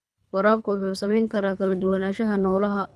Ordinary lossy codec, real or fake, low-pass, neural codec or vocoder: none; fake; none; codec, 24 kHz, 3 kbps, HILCodec